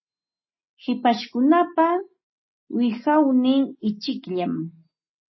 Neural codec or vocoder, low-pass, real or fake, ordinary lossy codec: none; 7.2 kHz; real; MP3, 24 kbps